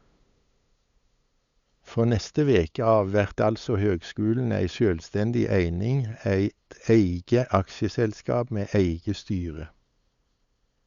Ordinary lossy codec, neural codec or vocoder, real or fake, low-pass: Opus, 64 kbps; codec, 16 kHz, 8 kbps, FunCodec, trained on LibriTTS, 25 frames a second; fake; 7.2 kHz